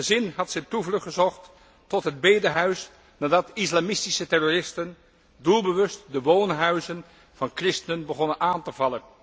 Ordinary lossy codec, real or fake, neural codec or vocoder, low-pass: none; real; none; none